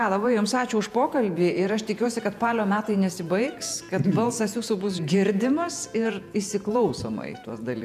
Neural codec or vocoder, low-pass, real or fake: vocoder, 48 kHz, 128 mel bands, Vocos; 14.4 kHz; fake